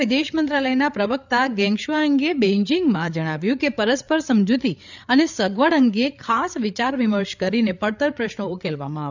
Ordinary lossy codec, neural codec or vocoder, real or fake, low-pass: none; codec, 16 kHz, 8 kbps, FreqCodec, larger model; fake; 7.2 kHz